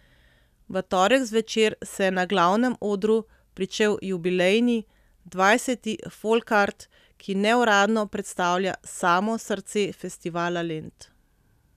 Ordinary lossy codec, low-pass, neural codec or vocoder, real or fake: none; 14.4 kHz; none; real